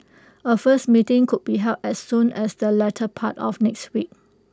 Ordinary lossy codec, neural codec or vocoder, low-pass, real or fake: none; none; none; real